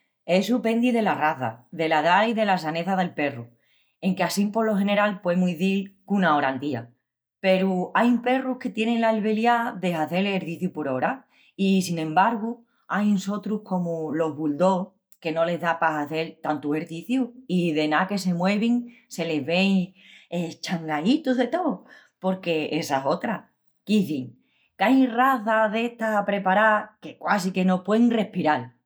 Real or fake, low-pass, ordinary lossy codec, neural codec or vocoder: real; none; none; none